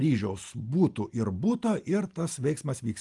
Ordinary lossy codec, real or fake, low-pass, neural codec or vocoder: Opus, 32 kbps; real; 10.8 kHz; none